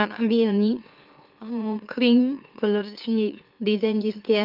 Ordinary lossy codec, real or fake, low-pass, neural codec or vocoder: Opus, 32 kbps; fake; 5.4 kHz; autoencoder, 44.1 kHz, a latent of 192 numbers a frame, MeloTTS